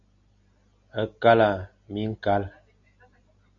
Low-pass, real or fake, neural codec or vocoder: 7.2 kHz; real; none